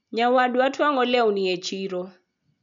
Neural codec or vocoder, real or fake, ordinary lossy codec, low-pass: none; real; none; 7.2 kHz